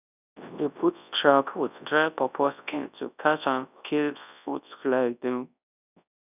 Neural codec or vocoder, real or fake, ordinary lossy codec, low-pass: codec, 24 kHz, 0.9 kbps, WavTokenizer, large speech release; fake; none; 3.6 kHz